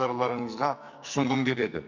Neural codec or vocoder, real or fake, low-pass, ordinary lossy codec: codec, 44.1 kHz, 2.6 kbps, SNAC; fake; 7.2 kHz; none